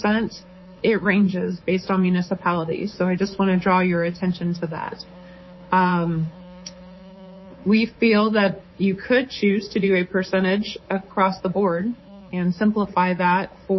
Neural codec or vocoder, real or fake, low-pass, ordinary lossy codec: codec, 24 kHz, 3.1 kbps, DualCodec; fake; 7.2 kHz; MP3, 24 kbps